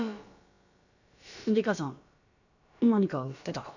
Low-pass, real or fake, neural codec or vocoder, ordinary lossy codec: 7.2 kHz; fake; codec, 16 kHz, about 1 kbps, DyCAST, with the encoder's durations; none